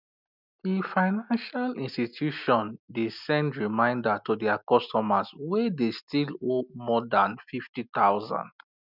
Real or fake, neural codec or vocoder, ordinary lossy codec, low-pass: real; none; none; 5.4 kHz